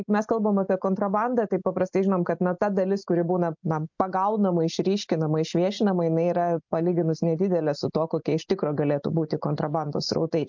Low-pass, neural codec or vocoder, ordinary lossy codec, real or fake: 7.2 kHz; none; MP3, 64 kbps; real